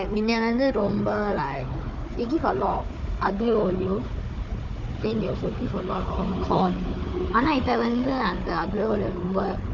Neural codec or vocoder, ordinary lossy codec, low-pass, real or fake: codec, 16 kHz, 4 kbps, FunCodec, trained on Chinese and English, 50 frames a second; MP3, 64 kbps; 7.2 kHz; fake